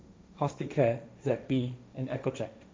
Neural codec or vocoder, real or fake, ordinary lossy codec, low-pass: codec, 16 kHz, 1.1 kbps, Voila-Tokenizer; fake; none; none